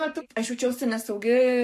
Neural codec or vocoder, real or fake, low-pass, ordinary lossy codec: codec, 44.1 kHz, 7.8 kbps, Pupu-Codec; fake; 14.4 kHz; MP3, 64 kbps